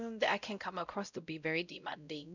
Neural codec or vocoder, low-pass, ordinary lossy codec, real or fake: codec, 16 kHz, 0.5 kbps, X-Codec, WavLM features, trained on Multilingual LibriSpeech; 7.2 kHz; none; fake